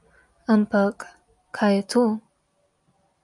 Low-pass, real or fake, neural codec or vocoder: 10.8 kHz; real; none